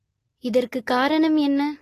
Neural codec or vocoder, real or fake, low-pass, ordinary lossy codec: none; real; 14.4 kHz; AAC, 48 kbps